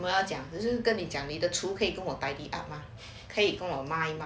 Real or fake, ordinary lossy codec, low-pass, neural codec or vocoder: real; none; none; none